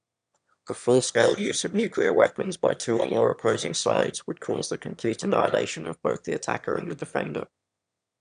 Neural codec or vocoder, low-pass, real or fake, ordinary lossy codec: autoencoder, 22.05 kHz, a latent of 192 numbers a frame, VITS, trained on one speaker; 9.9 kHz; fake; none